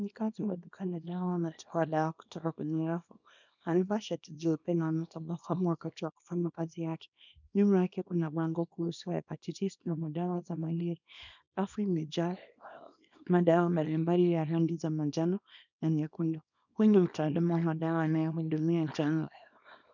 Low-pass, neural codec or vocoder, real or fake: 7.2 kHz; codec, 24 kHz, 0.9 kbps, WavTokenizer, small release; fake